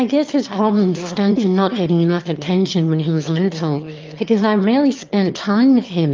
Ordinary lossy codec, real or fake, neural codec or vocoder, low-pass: Opus, 32 kbps; fake; autoencoder, 22.05 kHz, a latent of 192 numbers a frame, VITS, trained on one speaker; 7.2 kHz